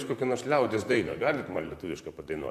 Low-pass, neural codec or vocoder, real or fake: 14.4 kHz; vocoder, 44.1 kHz, 128 mel bands, Pupu-Vocoder; fake